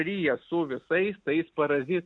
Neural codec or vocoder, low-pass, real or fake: none; 9.9 kHz; real